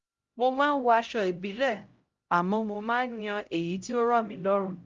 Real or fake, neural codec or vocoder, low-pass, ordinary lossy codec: fake; codec, 16 kHz, 0.5 kbps, X-Codec, HuBERT features, trained on LibriSpeech; 7.2 kHz; Opus, 32 kbps